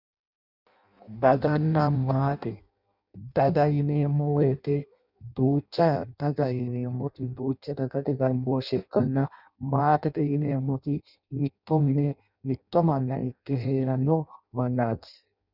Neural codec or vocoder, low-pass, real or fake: codec, 16 kHz in and 24 kHz out, 0.6 kbps, FireRedTTS-2 codec; 5.4 kHz; fake